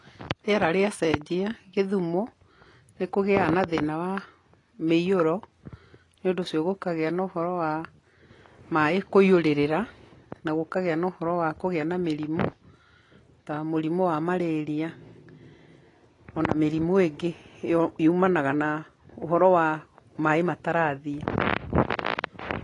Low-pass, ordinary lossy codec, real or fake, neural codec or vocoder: 10.8 kHz; AAC, 32 kbps; real; none